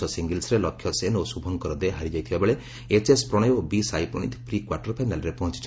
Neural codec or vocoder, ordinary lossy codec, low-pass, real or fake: none; none; none; real